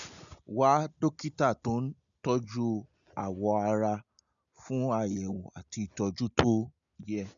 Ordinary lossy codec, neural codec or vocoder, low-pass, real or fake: none; none; 7.2 kHz; real